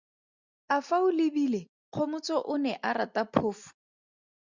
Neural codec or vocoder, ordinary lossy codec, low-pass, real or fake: none; Opus, 64 kbps; 7.2 kHz; real